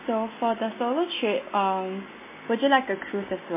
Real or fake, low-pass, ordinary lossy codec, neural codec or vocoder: real; 3.6 kHz; MP3, 16 kbps; none